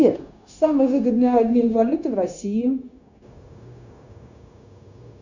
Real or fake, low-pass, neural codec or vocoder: fake; 7.2 kHz; codec, 16 kHz, 0.9 kbps, LongCat-Audio-Codec